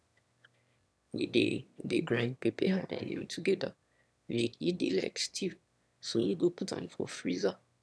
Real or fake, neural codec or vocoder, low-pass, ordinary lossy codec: fake; autoencoder, 22.05 kHz, a latent of 192 numbers a frame, VITS, trained on one speaker; none; none